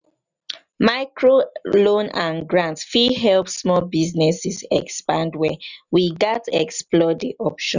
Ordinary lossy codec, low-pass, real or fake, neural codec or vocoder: none; 7.2 kHz; real; none